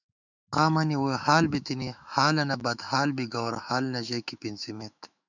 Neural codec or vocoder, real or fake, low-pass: autoencoder, 48 kHz, 128 numbers a frame, DAC-VAE, trained on Japanese speech; fake; 7.2 kHz